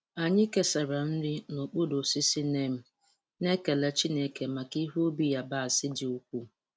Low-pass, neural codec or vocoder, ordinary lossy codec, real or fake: none; none; none; real